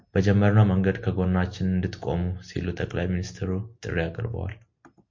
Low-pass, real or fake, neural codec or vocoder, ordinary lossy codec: 7.2 kHz; real; none; MP3, 48 kbps